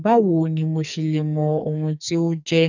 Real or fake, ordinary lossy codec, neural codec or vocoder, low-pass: fake; none; codec, 44.1 kHz, 2.6 kbps, SNAC; 7.2 kHz